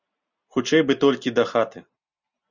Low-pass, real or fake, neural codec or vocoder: 7.2 kHz; real; none